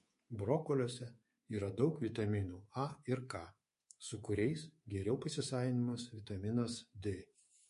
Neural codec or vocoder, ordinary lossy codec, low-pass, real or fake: codec, 24 kHz, 3.1 kbps, DualCodec; MP3, 48 kbps; 10.8 kHz; fake